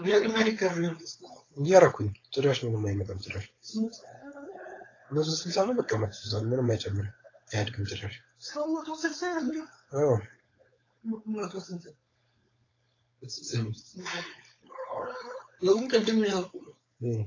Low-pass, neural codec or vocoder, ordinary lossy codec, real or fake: 7.2 kHz; codec, 16 kHz, 4.8 kbps, FACodec; AAC, 32 kbps; fake